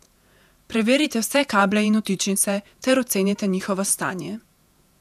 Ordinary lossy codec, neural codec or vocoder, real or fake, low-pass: none; vocoder, 48 kHz, 128 mel bands, Vocos; fake; 14.4 kHz